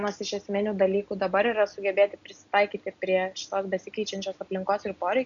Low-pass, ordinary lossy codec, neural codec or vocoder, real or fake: 7.2 kHz; MP3, 96 kbps; none; real